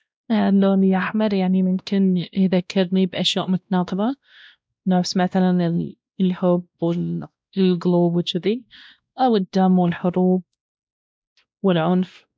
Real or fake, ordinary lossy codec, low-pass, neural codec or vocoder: fake; none; none; codec, 16 kHz, 1 kbps, X-Codec, WavLM features, trained on Multilingual LibriSpeech